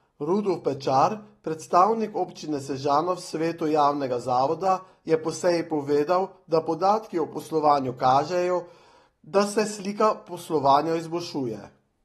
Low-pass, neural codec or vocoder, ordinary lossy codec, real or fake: 19.8 kHz; none; AAC, 32 kbps; real